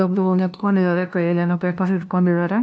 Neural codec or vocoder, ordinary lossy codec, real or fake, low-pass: codec, 16 kHz, 0.5 kbps, FunCodec, trained on LibriTTS, 25 frames a second; none; fake; none